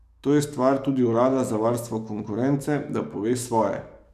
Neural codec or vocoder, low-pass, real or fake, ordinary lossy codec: codec, 44.1 kHz, 7.8 kbps, DAC; 14.4 kHz; fake; none